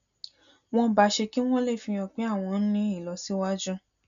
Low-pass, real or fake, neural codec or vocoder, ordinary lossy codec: 7.2 kHz; real; none; none